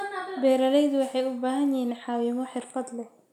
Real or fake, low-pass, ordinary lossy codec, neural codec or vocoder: real; 19.8 kHz; none; none